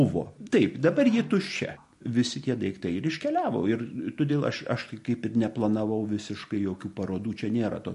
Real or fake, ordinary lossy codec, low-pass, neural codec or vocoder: real; MP3, 48 kbps; 14.4 kHz; none